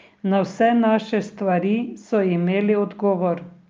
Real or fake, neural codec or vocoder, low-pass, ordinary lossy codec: real; none; 7.2 kHz; Opus, 32 kbps